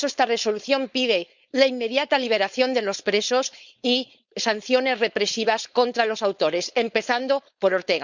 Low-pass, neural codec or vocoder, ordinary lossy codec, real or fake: 7.2 kHz; codec, 16 kHz, 4.8 kbps, FACodec; Opus, 64 kbps; fake